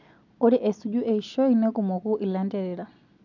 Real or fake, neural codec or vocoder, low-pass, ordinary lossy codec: real; none; 7.2 kHz; none